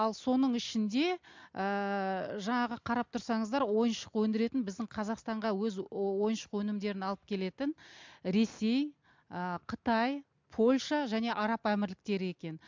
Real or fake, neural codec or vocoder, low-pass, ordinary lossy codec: real; none; 7.2 kHz; MP3, 64 kbps